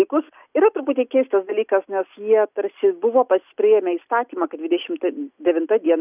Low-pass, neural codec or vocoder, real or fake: 3.6 kHz; none; real